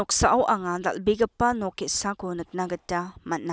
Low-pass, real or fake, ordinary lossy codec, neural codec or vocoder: none; real; none; none